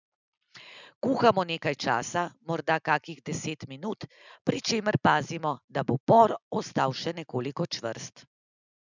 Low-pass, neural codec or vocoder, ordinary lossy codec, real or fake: 7.2 kHz; none; none; real